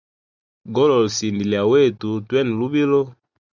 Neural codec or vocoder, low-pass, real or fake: none; 7.2 kHz; real